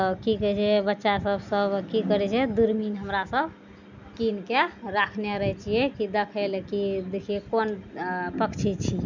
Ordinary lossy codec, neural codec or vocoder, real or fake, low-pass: none; none; real; 7.2 kHz